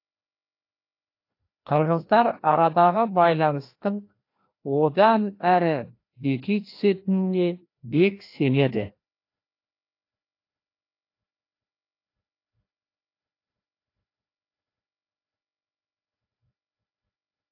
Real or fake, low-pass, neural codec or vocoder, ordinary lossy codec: fake; 5.4 kHz; codec, 16 kHz, 1 kbps, FreqCodec, larger model; AAC, 32 kbps